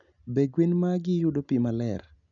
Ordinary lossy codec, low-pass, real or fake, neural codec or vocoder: none; 7.2 kHz; real; none